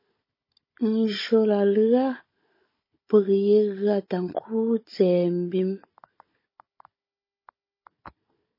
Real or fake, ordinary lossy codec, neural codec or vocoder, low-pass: fake; MP3, 24 kbps; codec, 16 kHz, 16 kbps, FunCodec, trained on Chinese and English, 50 frames a second; 5.4 kHz